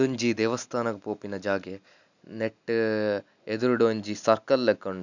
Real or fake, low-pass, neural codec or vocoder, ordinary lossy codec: real; 7.2 kHz; none; none